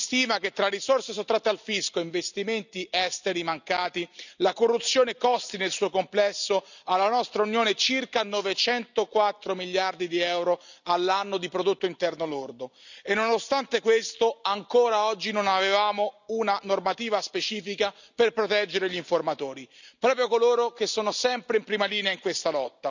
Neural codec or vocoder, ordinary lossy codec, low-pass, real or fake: none; none; 7.2 kHz; real